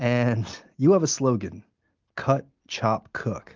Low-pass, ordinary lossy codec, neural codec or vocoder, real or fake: 7.2 kHz; Opus, 32 kbps; none; real